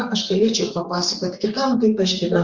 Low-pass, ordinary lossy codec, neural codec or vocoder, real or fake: 7.2 kHz; Opus, 32 kbps; codec, 32 kHz, 1.9 kbps, SNAC; fake